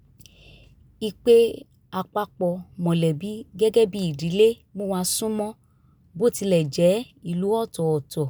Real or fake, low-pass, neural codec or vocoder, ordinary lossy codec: real; none; none; none